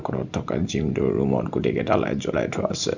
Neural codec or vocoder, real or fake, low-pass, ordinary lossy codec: none; real; 7.2 kHz; none